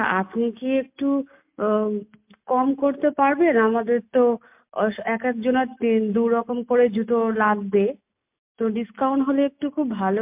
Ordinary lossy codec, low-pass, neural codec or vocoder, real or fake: MP3, 32 kbps; 3.6 kHz; none; real